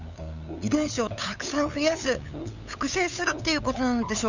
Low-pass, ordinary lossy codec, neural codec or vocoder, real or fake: 7.2 kHz; none; codec, 16 kHz, 8 kbps, FunCodec, trained on LibriTTS, 25 frames a second; fake